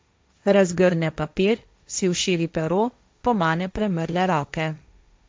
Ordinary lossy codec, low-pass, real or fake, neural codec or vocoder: none; none; fake; codec, 16 kHz, 1.1 kbps, Voila-Tokenizer